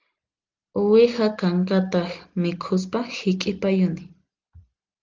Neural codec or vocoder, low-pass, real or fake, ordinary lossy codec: none; 7.2 kHz; real; Opus, 24 kbps